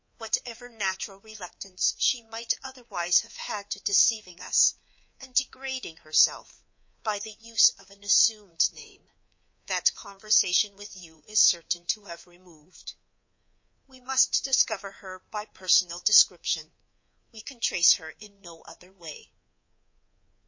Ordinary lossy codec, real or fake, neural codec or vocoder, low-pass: MP3, 32 kbps; fake; codec, 24 kHz, 3.1 kbps, DualCodec; 7.2 kHz